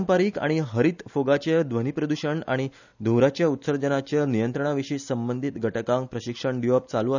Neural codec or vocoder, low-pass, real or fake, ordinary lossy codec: none; 7.2 kHz; real; none